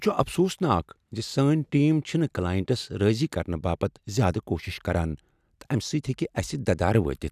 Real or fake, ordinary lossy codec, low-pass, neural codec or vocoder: real; none; 14.4 kHz; none